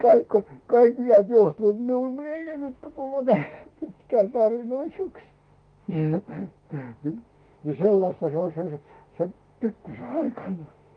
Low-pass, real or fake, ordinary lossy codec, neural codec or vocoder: 9.9 kHz; fake; Opus, 24 kbps; autoencoder, 48 kHz, 32 numbers a frame, DAC-VAE, trained on Japanese speech